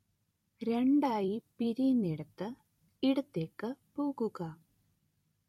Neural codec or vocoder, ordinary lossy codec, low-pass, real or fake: vocoder, 44.1 kHz, 128 mel bands every 256 samples, BigVGAN v2; MP3, 64 kbps; 19.8 kHz; fake